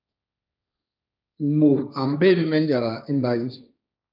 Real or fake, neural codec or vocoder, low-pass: fake; codec, 16 kHz, 1.1 kbps, Voila-Tokenizer; 5.4 kHz